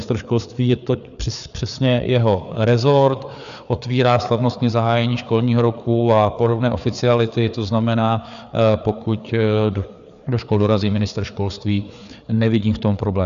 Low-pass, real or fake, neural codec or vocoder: 7.2 kHz; fake; codec, 16 kHz, 4 kbps, FreqCodec, larger model